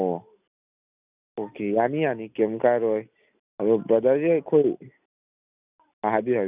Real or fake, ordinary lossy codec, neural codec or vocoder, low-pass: real; none; none; 3.6 kHz